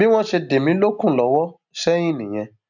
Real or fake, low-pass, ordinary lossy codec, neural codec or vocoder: real; 7.2 kHz; none; none